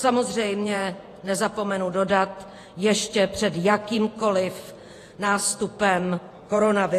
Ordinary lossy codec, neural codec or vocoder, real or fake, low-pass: AAC, 48 kbps; vocoder, 48 kHz, 128 mel bands, Vocos; fake; 14.4 kHz